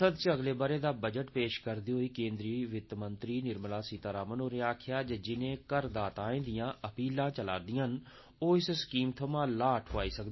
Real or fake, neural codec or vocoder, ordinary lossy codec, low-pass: real; none; MP3, 24 kbps; 7.2 kHz